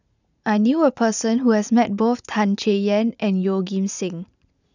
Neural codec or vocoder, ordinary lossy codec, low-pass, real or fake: vocoder, 44.1 kHz, 80 mel bands, Vocos; none; 7.2 kHz; fake